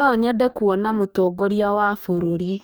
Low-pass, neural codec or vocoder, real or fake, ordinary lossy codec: none; codec, 44.1 kHz, 2.6 kbps, DAC; fake; none